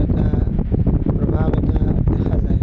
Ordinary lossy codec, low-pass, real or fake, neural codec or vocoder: none; none; real; none